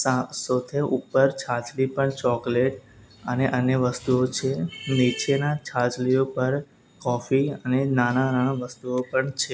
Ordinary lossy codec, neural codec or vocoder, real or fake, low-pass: none; none; real; none